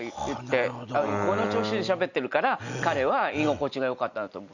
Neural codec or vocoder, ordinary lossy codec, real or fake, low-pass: none; none; real; 7.2 kHz